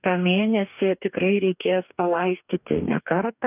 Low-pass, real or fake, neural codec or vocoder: 3.6 kHz; fake; codec, 44.1 kHz, 2.6 kbps, DAC